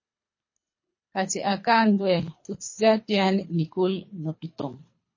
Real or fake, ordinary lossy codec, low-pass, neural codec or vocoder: fake; MP3, 32 kbps; 7.2 kHz; codec, 24 kHz, 3 kbps, HILCodec